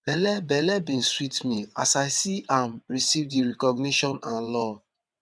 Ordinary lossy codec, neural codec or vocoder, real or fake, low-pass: none; vocoder, 22.05 kHz, 80 mel bands, WaveNeXt; fake; none